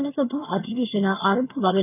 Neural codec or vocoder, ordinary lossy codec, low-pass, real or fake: vocoder, 22.05 kHz, 80 mel bands, HiFi-GAN; none; 3.6 kHz; fake